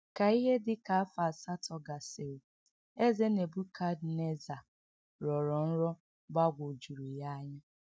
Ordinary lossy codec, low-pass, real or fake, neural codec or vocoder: none; none; real; none